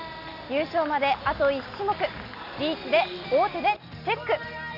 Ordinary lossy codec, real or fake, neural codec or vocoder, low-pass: none; real; none; 5.4 kHz